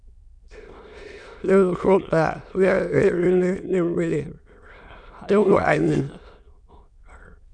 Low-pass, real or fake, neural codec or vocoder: 9.9 kHz; fake; autoencoder, 22.05 kHz, a latent of 192 numbers a frame, VITS, trained on many speakers